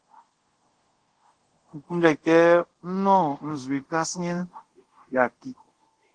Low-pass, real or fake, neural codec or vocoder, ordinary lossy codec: 9.9 kHz; fake; codec, 24 kHz, 0.5 kbps, DualCodec; Opus, 16 kbps